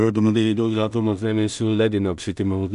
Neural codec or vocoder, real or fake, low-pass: codec, 16 kHz in and 24 kHz out, 0.4 kbps, LongCat-Audio-Codec, two codebook decoder; fake; 10.8 kHz